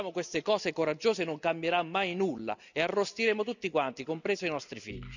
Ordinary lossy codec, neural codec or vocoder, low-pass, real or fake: none; none; 7.2 kHz; real